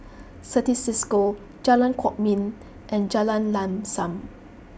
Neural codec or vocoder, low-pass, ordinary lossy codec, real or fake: none; none; none; real